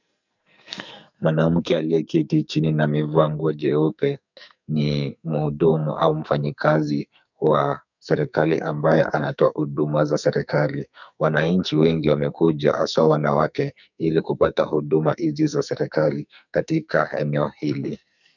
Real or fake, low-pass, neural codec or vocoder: fake; 7.2 kHz; codec, 44.1 kHz, 2.6 kbps, SNAC